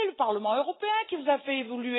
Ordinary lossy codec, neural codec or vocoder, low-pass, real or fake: AAC, 16 kbps; none; 7.2 kHz; real